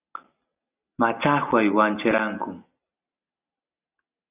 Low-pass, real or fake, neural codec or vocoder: 3.6 kHz; real; none